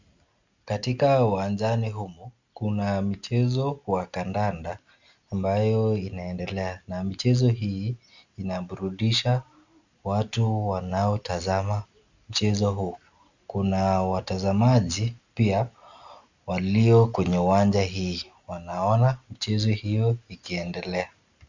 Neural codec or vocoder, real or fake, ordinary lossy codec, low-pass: none; real; Opus, 64 kbps; 7.2 kHz